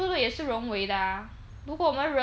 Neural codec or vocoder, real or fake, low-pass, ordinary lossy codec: none; real; none; none